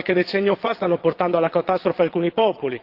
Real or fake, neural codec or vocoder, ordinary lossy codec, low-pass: fake; vocoder, 22.05 kHz, 80 mel bands, Vocos; Opus, 16 kbps; 5.4 kHz